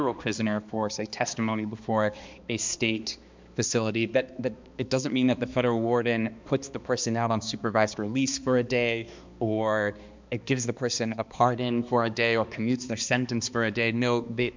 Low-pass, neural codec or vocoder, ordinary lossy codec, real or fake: 7.2 kHz; codec, 16 kHz, 2 kbps, X-Codec, HuBERT features, trained on balanced general audio; MP3, 64 kbps; fake